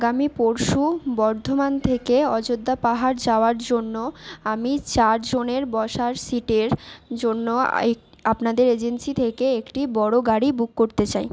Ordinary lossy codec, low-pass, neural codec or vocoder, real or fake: none; none; none; real